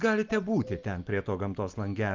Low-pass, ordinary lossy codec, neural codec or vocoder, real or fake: 7.2 kHz; Opus, 24 kbps; none; real